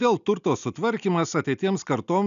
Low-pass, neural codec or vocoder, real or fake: 7.2 kHz; none; real